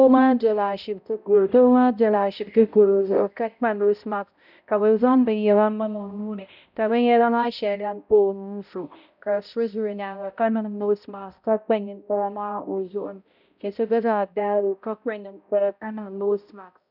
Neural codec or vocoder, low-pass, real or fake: codec, 16 kHz, 0.5 kbps, X-Codec, HuBERT features, trained on balanced general audio; 5.4 kHz; fake